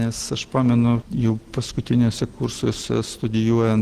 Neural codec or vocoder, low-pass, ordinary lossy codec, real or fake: none; 14.4 kHz; Opus, 16 kbps; real